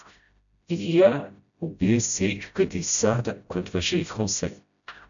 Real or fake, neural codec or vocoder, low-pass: fake; codec, 16 kHz, 0.5 kbps, FreqCodec, smaller model; 7.2 kHz